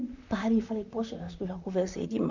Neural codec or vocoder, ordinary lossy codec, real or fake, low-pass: vocoder, 44.1 kHz, 128 mel bands, Pupu-Vocoder; none; fake; 7.2 kHz